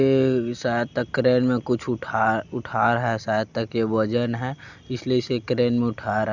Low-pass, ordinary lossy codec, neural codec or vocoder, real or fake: 7.2 kHz; none; none; real